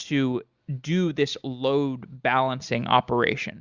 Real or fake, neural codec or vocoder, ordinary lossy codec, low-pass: real; none; Opus, 64 kbps; 7.2 kHz